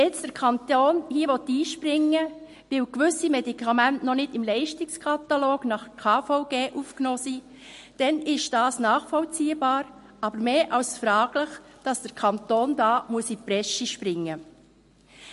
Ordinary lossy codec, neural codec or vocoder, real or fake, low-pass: MP3, 48 kbps; none; real; 14.4 kHz